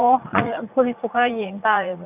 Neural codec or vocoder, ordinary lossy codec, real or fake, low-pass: vocoder, 44.1 kHz, 80 mel bands, Vocos; none; fake; 3.6 kHz